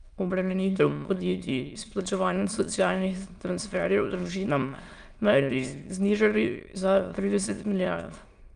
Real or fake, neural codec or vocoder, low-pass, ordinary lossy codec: fake; autoencoder, 22.05 kHz, a latent of 192 numbers a frame, VITS, trained on many speakers; 9.9 kHz; Opus, 32 kbps